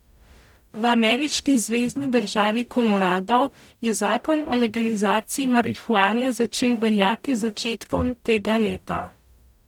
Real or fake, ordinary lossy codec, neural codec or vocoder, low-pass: fake; none; codec, 44.1 kHz, 0.9 kbps, DAC; 19.8 kHz